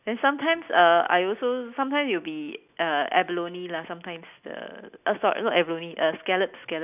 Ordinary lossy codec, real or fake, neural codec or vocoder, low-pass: none; real; none; 3.6 kHz